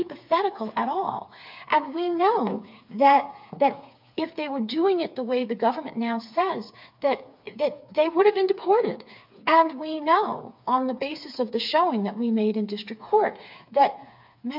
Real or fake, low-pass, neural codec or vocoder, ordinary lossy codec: fake; 5.4 kHz; codec, 16 kHz, 4 kbps, FreqCodec, smaller model; MP3, 48 kbps